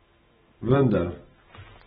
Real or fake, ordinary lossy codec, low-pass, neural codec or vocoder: real; AAC, 16 kbps; 19.8 kHz; none